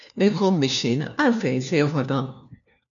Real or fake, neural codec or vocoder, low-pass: fake; codec, 16 kHz, 1 kbps, FunCodec, trained on LibriTTS, 50 frames a second; 7.2 kHz